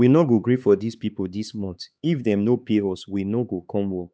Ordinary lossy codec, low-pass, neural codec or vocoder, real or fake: none; none; codec, 16 kHz, 4 kbps, X-Codec, HuBERT features, trained on LibriSpeech; fake